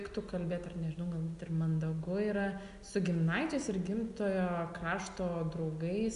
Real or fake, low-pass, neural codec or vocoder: real; 10.8 kHz; none